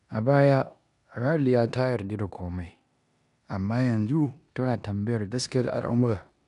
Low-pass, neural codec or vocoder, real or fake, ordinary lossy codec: 10.8 kHz; codec, 16 kHz in and 24 kHz out, 0.9 kbps, LongCat-Audio-Codec, four codebook decoder; fake; none